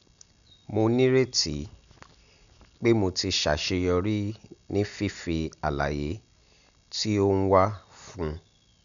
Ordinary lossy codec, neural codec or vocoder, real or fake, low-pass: none; none; real; 7.2 kHz